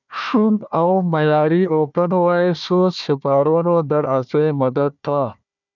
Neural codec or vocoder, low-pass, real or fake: codec, 16 kHz, 1 kbps, FunCodec, trained on Chinese and English, 50 frames a second; 7.2 kHz; fake